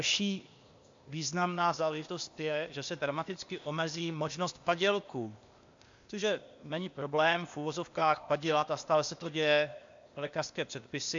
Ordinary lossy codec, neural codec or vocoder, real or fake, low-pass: MP3, 64 kbps; codec, 16 kHz, 0.8 kbps, ZipCodec; fake; 7.2 kHz